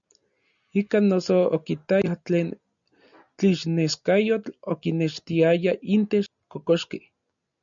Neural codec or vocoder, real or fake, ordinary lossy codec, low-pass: none; real; MP3, 96 kbps; 7.2 kHz